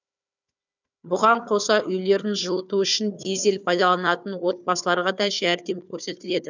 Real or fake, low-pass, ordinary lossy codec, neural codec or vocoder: fake; 7.2 kHz; none; codec, 16 kHz, 4 kbps, FunCodec, trained on Chinese and English, 50 frames a second